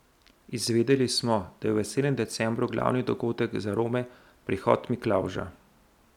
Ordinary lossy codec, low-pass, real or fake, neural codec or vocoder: none; 19.8 kHz; real; none